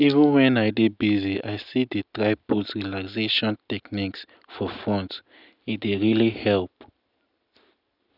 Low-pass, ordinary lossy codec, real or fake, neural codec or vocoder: 5.4 kHz; none; real; none